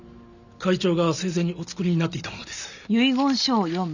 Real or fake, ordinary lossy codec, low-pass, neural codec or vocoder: real; none; 7.2 kHz; none